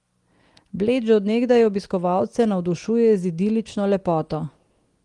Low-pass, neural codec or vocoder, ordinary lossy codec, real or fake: 10.8 kHz; none; Opus, 24 kbps; real